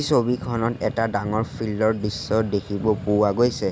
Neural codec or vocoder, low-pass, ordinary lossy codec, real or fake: none; none; none; real